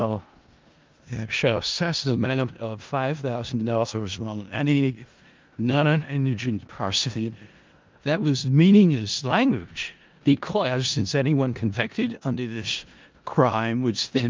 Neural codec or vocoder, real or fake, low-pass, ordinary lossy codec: codec, 16 kHz in and 24 kHz out, 0.4 kbps, LongCat-Audio-Codec, four codebook decoder; fake; 7.2 kHz; Opus, 24 kbps